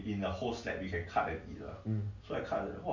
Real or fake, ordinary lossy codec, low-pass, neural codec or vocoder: real; MP3, 64 kbps; 7.2 kHz; none